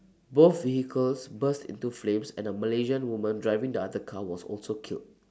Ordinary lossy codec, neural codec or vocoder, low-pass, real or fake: none; none; none; real